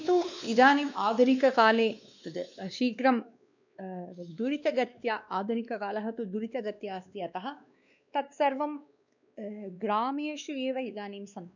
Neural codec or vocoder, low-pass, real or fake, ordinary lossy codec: codec, 16 kHz, 2 kbps, X-Codec, WavLM features, trained on Multilingual LibriSpeech; 7.2 kHz; fake; none